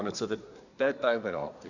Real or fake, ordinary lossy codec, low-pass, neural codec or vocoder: fake; none; 7.2 kHz; codec, 24 kHz, 1 kbps, SNAC